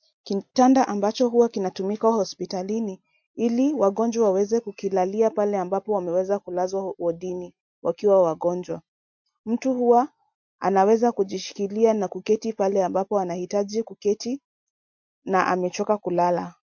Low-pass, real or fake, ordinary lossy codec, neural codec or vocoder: 7.2 kHz; real; MP3, 48 kbps; none